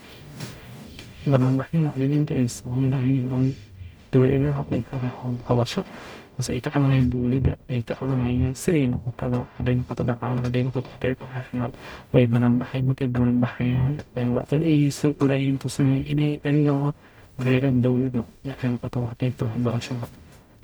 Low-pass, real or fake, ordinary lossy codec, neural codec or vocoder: none; fake; none; codec, 44.1 kHz, 0.9 kbps, DAC